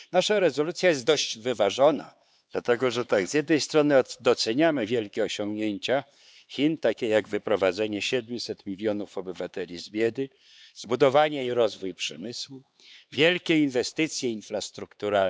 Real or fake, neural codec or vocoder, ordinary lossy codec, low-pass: fake; codec, 16 kHz, 4 kbps, X-Codec, HuBERT features, trained on LibriSpeech; none; none